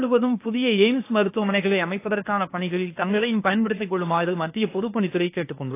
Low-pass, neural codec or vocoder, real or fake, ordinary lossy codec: 3.6 kHz; codec, 16 kHz, 0.8 kbps, ZipCodec; fake; AAC, 24 kbps